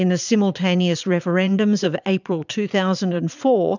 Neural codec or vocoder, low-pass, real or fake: vocoder, 22.05 kHz, 80 mel bands, Vocos; 7.2 kHz; fake